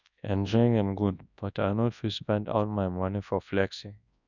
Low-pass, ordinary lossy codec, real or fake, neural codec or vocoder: 7.2 kHz; none; fake; codec, 24 kHz, 0.9 kbps, WavTokenizer, large speech release